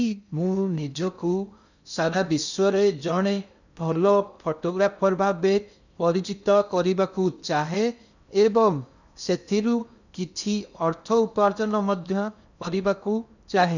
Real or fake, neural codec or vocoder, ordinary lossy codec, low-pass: fake; codec, 16 kHz in and 24 kHz out, 0.6 kbps, FocalCodec, streaming, 2048 codes; none; 7.2 kHz